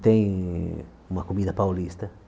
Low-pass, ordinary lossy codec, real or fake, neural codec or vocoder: none; none; real; none